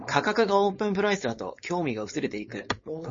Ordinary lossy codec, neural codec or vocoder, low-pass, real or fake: MP3, 32 kbps; codec, 16 kHz, 4.8 kbps, FACodec; 7.2 kHz; fake